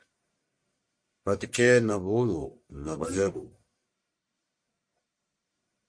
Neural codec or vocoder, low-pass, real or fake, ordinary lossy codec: codec, 44.1 kHz, 1.7 kbps, Pupu-Codec; 9.9 kHz; fake; MP3, 48 kbps